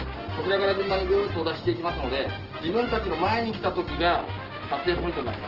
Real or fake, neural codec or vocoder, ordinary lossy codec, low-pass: real; none; Opus, 16 kbps; 5.4 kHz